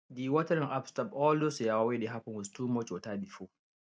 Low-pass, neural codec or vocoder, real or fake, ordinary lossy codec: none; none; real; none